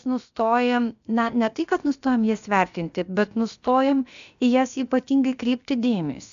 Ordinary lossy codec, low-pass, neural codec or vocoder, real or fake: AAC, 96 kbps; 7.2 kHz; codec, 16 kHz, about 1 kbps, DyCAST, with the encoder's durations; fake